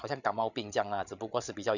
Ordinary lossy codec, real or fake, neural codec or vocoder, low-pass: none; fake; codec, 16 kHz, 16 kbps, FreqCodec, larger model; 7.2 kHz